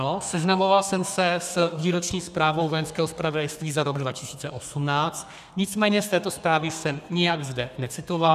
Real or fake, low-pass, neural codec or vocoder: fake; 14.4 kHz; codec, 32 kHz, 1.9 kbps, SNAC